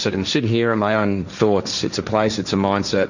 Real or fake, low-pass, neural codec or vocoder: fake; 7.2 kHz; codec, 16 kHz, 1.1 kbps, Voila-Tokenizer